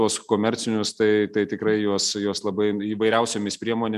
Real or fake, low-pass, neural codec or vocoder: real; 14.4 kHz; none